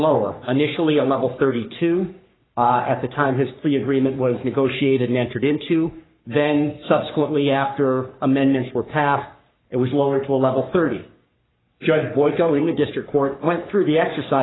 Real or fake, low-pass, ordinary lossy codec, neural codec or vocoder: fake; 7.2 kHz; AAC, 16 kbps; codec, 44.1 kHz, 3.4 kbps, Pupu-Codec